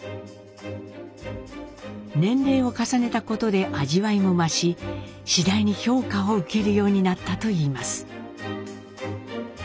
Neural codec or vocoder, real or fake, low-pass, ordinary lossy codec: none; real; none; none